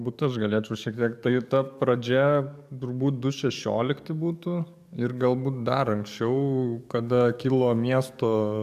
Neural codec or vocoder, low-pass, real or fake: codec, 44.1 kHz, 7.8 kbps, DAC; 14.4 kHz; fake